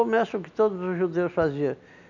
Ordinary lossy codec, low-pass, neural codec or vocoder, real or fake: none; 7.2 kHz; none; real